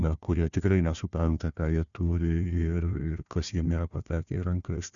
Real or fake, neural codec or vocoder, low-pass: fake; codec, 16 kHz, 1 kbps, FunCodec, trained on Chinese and English, 50 frames a second; 7.2 kHz